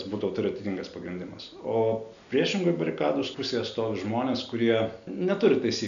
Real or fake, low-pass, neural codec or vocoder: real; 7.2 kHz; none